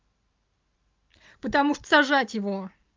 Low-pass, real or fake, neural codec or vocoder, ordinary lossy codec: 7.2 kHz; real; none; Opus, 32 kbps